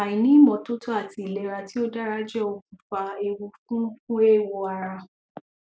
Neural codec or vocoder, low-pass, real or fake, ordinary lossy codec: none; none; real; none